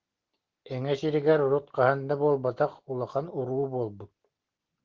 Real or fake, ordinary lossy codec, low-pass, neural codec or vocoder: real; Opus, 16 kbps; 7.2 kHz; none